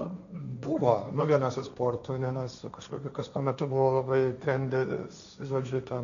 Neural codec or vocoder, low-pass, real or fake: codec, 16 kHz, 1.1 kbps, Voila-Tokenizer; 7.2 kHz; fake